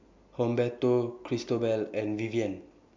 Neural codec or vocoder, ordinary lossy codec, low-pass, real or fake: none; MP3, 64 kbps; 7.2 kHz; real